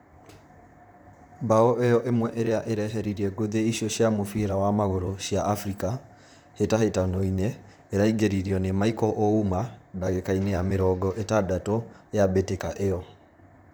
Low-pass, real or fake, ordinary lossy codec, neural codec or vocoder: none; fake; none; vocoder, 44.1 kHz, 128 mel bands, Pupu-Vocoder